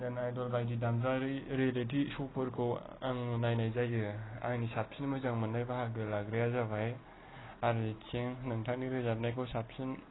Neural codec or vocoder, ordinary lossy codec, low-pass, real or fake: none; AAC, 16 kbps; 7.2 kHz; real